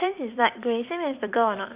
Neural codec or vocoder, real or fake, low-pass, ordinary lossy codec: vocoder, 44.1 kHz, 128 mel bands every 256 samples, BigVGAN v2; fake; 3.6 kHz; Opus, 64 kbps